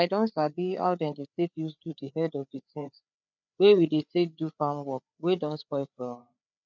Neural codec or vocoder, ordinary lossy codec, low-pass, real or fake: codec, 16 kHz, 4 kbps, FreqCodec, larger model; none; 7.2 kHz; fake